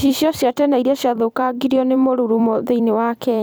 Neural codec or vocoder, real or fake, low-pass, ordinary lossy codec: vocoder, 44.1 kHz, 128 mel bands every 256 samples, BigVGAN v2; fake; none; none